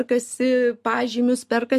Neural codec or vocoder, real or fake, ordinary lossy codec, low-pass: none; real; MP3, 64 kbps; 14.4 kHz